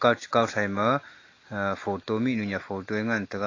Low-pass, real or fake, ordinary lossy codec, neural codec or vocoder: 7.2 kHz; real; AAC, 32 kbps; none